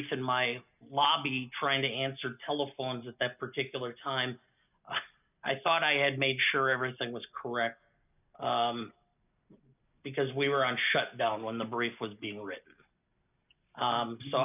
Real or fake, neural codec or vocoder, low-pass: real; none; 3.6 kHz